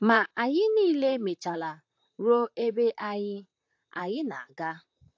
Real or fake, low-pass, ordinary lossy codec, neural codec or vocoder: fake; 7.2 kHz; AAC, 48 kbps; vocoder, 44.1 kHz, 128 mel bands, Pupu-Vocoder